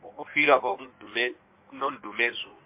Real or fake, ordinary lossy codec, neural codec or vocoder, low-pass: fake; MP3, 32 kbps; codec, 16 kHz in and 24 kHz out, 1.1 kbps, FireRedTTS-2 codec; 3.6 kHz